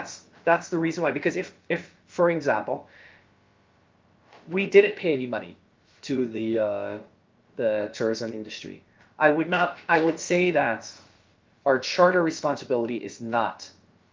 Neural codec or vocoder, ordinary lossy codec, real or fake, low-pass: codec, 16 kHz, about 1 kbps, DyCAST, with the encoder's durations; Opus, 32 kbps; fake; 7.2 kHz